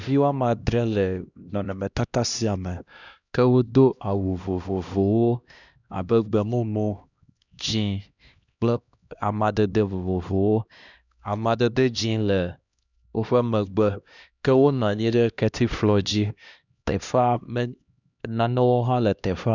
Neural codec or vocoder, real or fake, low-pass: codec, 16 kHz, 1 kbps, X-Codec, HuBERT features, trained on LibriSpeech; fake; 7.2 kHz